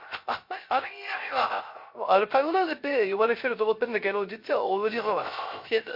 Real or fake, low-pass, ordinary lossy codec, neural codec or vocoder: fake; 5.4 kHz; MP3, 32 kbps; codec, 16 kHz, 0.3 kbps, FocalCodec